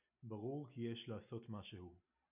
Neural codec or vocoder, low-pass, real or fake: none; 3.6 kHz; real